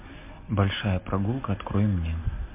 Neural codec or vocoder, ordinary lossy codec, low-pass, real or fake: none; MP3, 32 kbps; 3.6 kHz; real